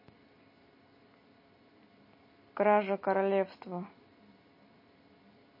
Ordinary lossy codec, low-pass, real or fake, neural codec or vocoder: MP3, 24 kbps; 5.4 kHz; real; none